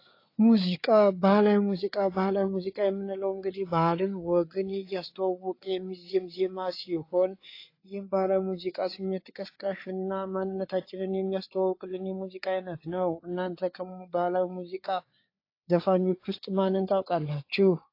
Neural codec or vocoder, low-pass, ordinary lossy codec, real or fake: codec, 16 kHz, 4 kbps, FreqCodec, larger model; 5.4 kHz; AAC, 32 kbps; fake